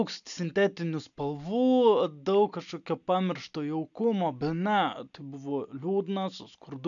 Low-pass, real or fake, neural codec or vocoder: 7.2 kHz; real; none